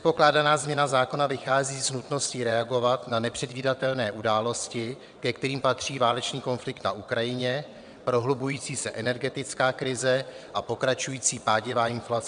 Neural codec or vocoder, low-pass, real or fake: vocoder, 22.05 kHz, 80 mel bands, WaveNeXt; 9.9 kHz; fake